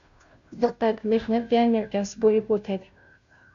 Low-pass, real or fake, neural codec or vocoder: 7.2 kHz; fake; codec, 16 kHz, 0.5 kbps, FunCodec, trained on Chinese and English, 25 frames a second